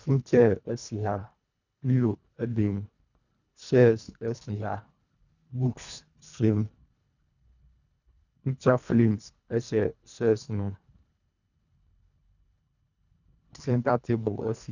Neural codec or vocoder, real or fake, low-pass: codec, 24 kHz, 1.5 kbps, HILCodec; fake; 7.2 kHz